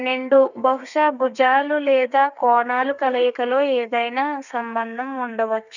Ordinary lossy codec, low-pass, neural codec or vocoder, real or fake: none; 7.2 kHz; codec, 32 kHz, 1.9 kbps, SNAC; fake